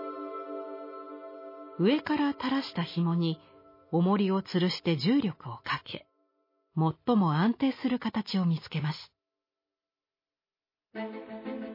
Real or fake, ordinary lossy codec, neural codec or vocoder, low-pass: real; MP3, 24 kbps; none; 5.4 kHz